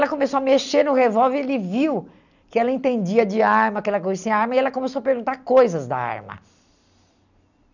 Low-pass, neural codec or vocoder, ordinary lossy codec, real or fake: 7.2 kHz; none; none; real